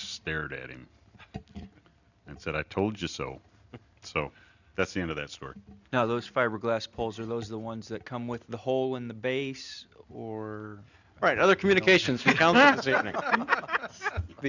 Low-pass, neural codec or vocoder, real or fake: 7.2 kHz; none; real